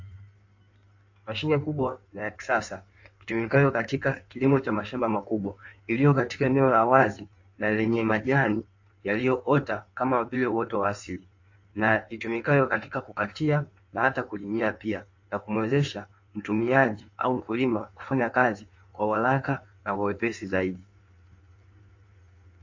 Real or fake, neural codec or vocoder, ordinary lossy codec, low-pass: fake; codec, 16 kHz in and 24 kHz out, 1.1 kbps, FireRedTTS-2 codec; AAC, 48 kbps; 7.2 kHz